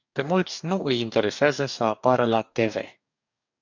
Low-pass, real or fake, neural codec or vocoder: 7.2 kHz; fake; codec, 44.1 kHz, 2.6 kbps, DAC